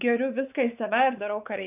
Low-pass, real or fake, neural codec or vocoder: 3.6 kHz; fake; codec, 16 kHz, 4 kbps, X-Codec, WavLM features, trained on Multilingual LibriSpeech